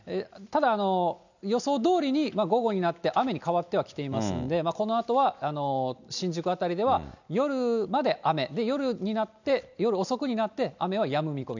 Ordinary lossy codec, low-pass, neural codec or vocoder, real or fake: none; 7.2 kHz; none; real